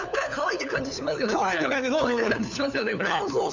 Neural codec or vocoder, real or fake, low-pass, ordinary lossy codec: codec, 16 kHz, 4 kbps, FunCodec, trained on LibriTTS, 50 frames a second; fake; 7.2 kHz; none